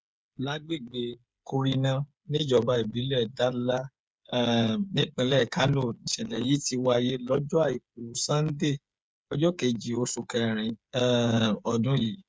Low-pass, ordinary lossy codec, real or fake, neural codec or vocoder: none; none; fake; codec, 16 kHz, 8 kbps, FreqCodec, smaller model